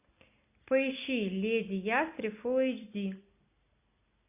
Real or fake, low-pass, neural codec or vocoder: real; 3.6 kHz; none